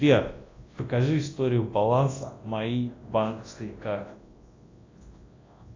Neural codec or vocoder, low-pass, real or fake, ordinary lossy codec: codec, 24 kHz, 0.9 kbps, WavTokenizer, large speech release; 7.2 kHz; fake; AAC, 32 kbps